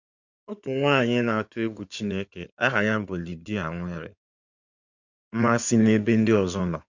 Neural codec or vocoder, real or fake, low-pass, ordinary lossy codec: codec, 16 kHz in and 24 kHz out, 2.2 kbps, FireRedTTS-2 codec; fake; 7.2 kHz; none